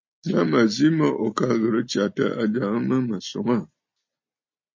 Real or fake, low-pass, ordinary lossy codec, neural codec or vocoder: real; 7.2 kHz; MP3, 32 kbps; none